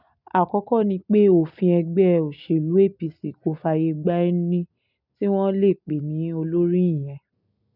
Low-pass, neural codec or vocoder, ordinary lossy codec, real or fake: 5.4 kHz; none; none; real